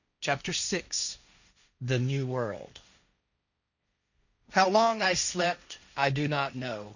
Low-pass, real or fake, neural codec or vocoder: 7.2 kHz; fake; codec, 16 kHz, 1.1 kbps, Voila-Tokenizer